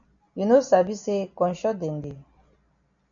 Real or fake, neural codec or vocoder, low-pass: real; none; 7.2 kHz